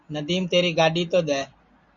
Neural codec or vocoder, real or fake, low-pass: none; real; 7.2 kHz